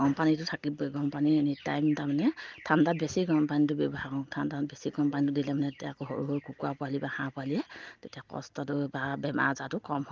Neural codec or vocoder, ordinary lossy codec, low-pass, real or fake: none; Opus, 32 kbps; 7.2 kHz; real